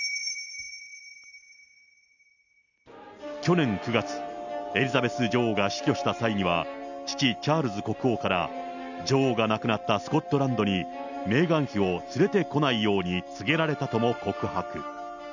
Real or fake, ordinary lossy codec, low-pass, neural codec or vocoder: real; none; 7.2 kHz; none